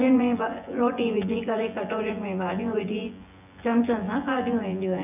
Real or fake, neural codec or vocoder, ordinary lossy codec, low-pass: fake; vocoder, 24 kHz, 100 mel bands, Vocos; none; 3.6 kHz